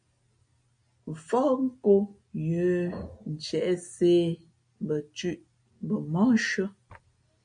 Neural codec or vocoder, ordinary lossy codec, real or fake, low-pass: none; MP3, 48 kbps; real; 9.9 kHz